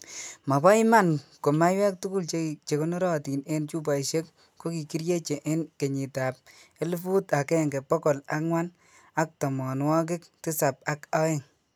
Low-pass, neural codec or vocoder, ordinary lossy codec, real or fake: none; none; none; real